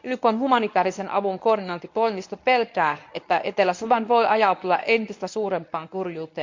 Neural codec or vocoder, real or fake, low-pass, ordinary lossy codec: codec, 24 kHz, 0.9 kbps, WavTokenizer, medium speech release version 1; fake; 7.2 kHz; MP3, 64 kbps